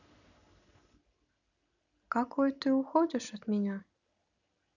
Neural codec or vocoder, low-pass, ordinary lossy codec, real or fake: none; 7.2 kHz; none; real